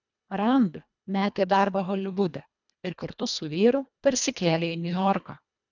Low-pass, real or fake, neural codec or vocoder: 7.2 kHz; fake; codec, 24 kHz, 1.5 kbps, HILCodec